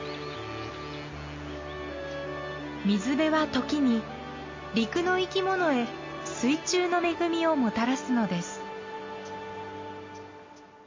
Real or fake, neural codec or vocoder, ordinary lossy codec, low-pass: real; none; MP3, 32 kbps; 7.2 kHz